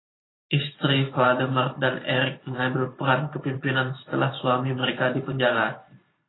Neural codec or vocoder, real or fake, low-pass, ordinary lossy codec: vocoder, 44.1 kHz, 128 mel bands every 512 samples, BigVGAN v2; fake; 7.2 kHz; AAC, 16 kbps